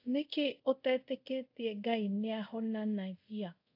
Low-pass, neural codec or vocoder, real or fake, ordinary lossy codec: 5.4 kHz; codec, 24 kHz, 0.5 kbps, DualCodec; fake; AAC, 48 kbps